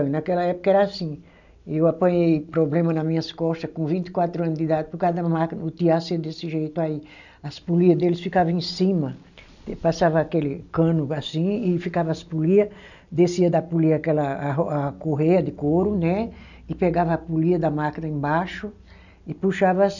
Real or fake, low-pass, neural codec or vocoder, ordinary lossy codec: real; 7.2 kHz; none; none